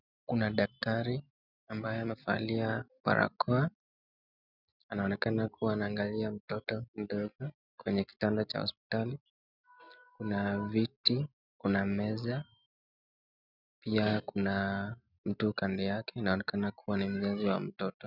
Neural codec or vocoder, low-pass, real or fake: none; 5.4 kHz; real